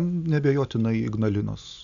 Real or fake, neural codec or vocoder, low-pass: real; none; 7.2 kHz